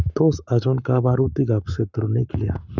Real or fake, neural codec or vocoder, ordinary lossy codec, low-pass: fake; codec, 44.1 kHz, 7.8 kbps, Pupu-Codec; none; 7.2 kHz